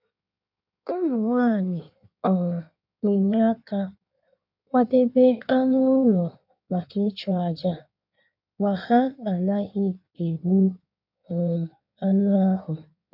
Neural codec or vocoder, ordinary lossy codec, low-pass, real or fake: codec, 16 kHz in and 24 kHz out, 1.1 kbps, FireRedTTS-2 codec; none; 5.4 kHz; fake